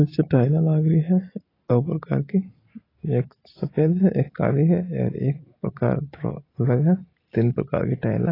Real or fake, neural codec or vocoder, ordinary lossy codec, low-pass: real; none; AAC, 24 kbps; 5.4 kHz